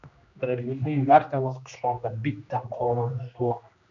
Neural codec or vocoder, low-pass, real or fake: codec, 16 kHz, 1 kbps, X-Codec, HuBERT features, trained on general audio; 7.2 kHz; fake